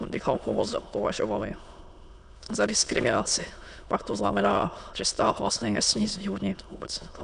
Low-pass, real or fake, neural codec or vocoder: 9.9 kHz; fake; autoencoder, 22.05 kHz, a latent of 192 numbers a frame, VITS, trained on many speakers